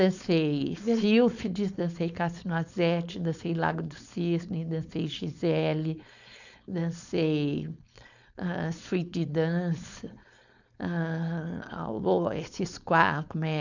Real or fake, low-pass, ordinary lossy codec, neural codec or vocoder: fake; 7.2 kHz; none; codec, 16 kHz, 4.8 kbps, FACodec